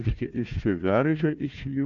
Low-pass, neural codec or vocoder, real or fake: 7.2 kHz; codec, 16 kHz, 1 kbps, FunCodec, trained on Chinese and English, 50 frames a second; fake